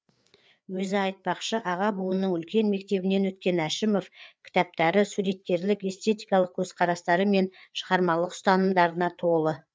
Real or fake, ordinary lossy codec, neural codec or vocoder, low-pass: fake; none; codec, 16 kHz, 4 kbps, FreqCodec, larger model; none